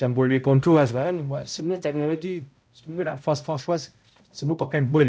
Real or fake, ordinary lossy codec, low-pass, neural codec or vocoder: fake; none; none; codec, 16 kHz, 0.5 kbps, X-Codec, HuBERT features, trained on balanced general audio